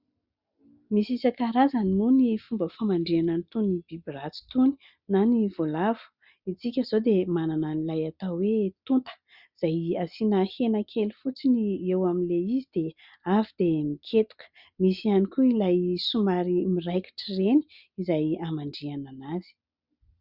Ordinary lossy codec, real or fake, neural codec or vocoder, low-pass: Opus, 64 kbps; real; none; 5.4 kHz